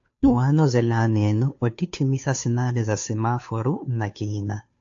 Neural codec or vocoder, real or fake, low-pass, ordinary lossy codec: codec, 16 kHz, 2 kbps, FunCodec, trained on Chinese and English, 25 frames a second; fake; 7.2 kHz; AAC, 48 kbps